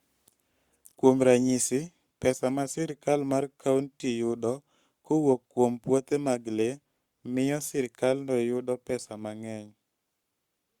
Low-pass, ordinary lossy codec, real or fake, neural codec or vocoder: 19.8 kHz; Opus, 64 kbps; fake; codec, 44.1 kHz, 7.8 kbps, Pupu-Codec